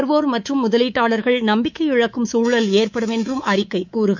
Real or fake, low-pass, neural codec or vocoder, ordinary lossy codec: fake; 7.2 kHz; codec, 24 kHz, 3.1 kbps, DualCodec; none